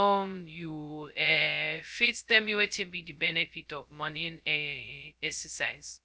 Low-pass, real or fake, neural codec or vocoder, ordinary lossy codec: none; fake; codec, 16 kHz, 0.2 kbps, FocalCodec; none